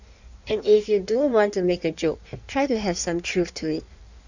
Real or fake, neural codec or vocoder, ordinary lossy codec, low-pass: fake; codec, 16 kHz in and 24 kHz out, 1.1 kbps, FireRedTTS-2 codec; none; 7.2 kHz